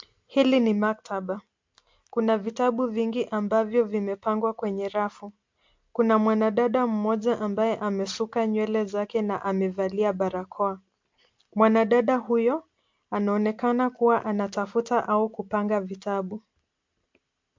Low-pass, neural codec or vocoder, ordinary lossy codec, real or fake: 7.2 kHz; none; MP3, 48 kbps; real